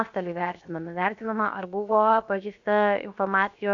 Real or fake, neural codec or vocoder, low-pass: fake; codec, 16 kHz, 0.7 kbps, FocalCodec; 7.2 kHz